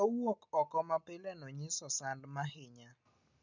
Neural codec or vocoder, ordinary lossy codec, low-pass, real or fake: none; none; 7.2 kHz; real